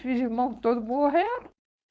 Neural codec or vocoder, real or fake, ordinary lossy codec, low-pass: codec, 16 kHz, 4.8 kbps, FACodec; fake; none; none